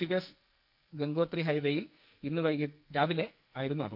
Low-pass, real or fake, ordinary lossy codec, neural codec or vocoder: 5.4 kHz; fake; none; codec, 44.1 kHz, 2.6 kbps, SNAC